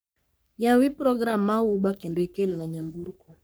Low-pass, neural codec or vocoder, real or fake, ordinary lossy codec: none; codec, 44.1 kHz, 3.4 kbps, Pupu-Codec; fake; none